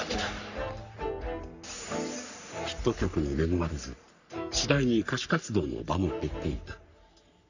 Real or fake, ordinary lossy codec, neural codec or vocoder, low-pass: fake; none; codec, 44.1 kHz, 3.4 kbps, Pupu-Codec; 7.2 kHz